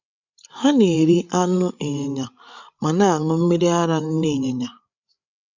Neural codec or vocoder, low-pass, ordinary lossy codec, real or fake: codec, 16 kHz, 8 kbps, FreqCodec, larger model; 7.2 kHz; none; fake